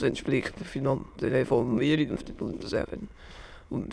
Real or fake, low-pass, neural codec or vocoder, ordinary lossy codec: fake; none; autoencoder, 22.05 kHz, a latent of 192 numbers a frame, VITS, trained on many speakers; none